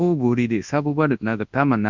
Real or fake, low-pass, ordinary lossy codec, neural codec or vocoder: fake; 7.2 kHz; Opus, 64 kbps; codec, 24 kHz, 0.9 kbps, WavTokenizer, large speech release